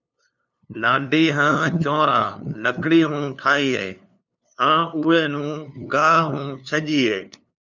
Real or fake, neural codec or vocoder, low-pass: fake; codec, 16 kHz, 2 kbps, FunCodec, trained on LibriTTS, 25 frames a second; 7.2 kHz